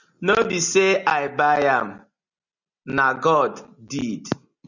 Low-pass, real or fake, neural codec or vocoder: 7.2 kHz; real; none